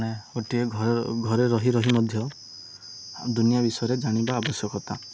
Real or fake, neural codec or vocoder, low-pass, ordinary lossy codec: real; none; none; none